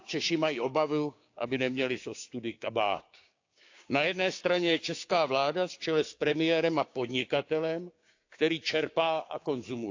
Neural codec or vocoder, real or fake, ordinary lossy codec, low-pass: codec, 16 kHz, 6 kbps, DAC; fake; AAC, 48 kbps; 7.2 kHz